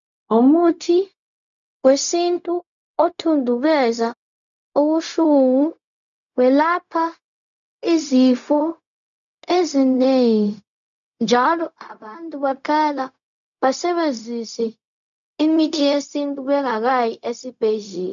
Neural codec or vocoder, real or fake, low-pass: codec, 16 kHz, 0.4 kbps, LongCat-Audio-Codec; fake; 7.2 kHz